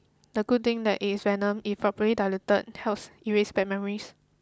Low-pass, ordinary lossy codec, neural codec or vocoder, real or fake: none; none; none; real